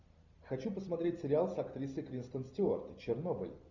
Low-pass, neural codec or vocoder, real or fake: 7.2 kHz; none; real